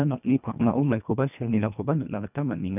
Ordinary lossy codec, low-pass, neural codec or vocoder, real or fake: none; 3.6 kHz; codec, 24 kHz, 1.5 kbps, HILCodec; fake